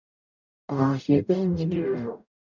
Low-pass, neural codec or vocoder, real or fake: 7.2 kHz; codec, 44.1 kHz, 0.9 kbps, DAC; fake